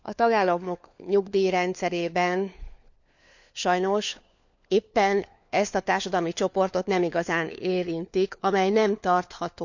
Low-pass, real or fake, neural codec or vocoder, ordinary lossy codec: 7.2 kHz; fake; codec, 16 kHz, 4 kbps, FunCodec, trained on LibriTTS, 50 frames a second; none